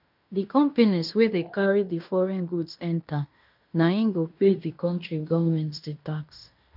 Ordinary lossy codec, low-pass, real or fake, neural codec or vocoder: none; 5.4 kHz; fake; codec, 16 kHz in and 24 kHz out, 0.9 kbps, LongCat-Audio-Codec, fine tuned four codebook decoder